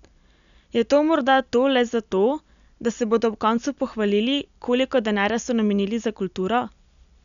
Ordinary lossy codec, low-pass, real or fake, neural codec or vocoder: none; 7.2 kHz; real; none